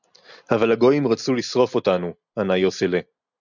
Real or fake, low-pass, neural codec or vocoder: real; 7.2 kHz; none